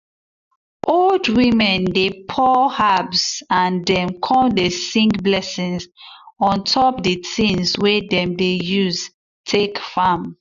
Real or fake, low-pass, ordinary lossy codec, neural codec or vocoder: real; 7.2 kHz; AAC, 96 kbps; none